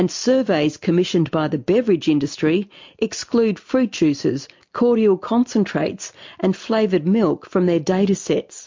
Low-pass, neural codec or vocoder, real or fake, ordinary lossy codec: 7.2 kHz; none; real; MP3, 48 kbps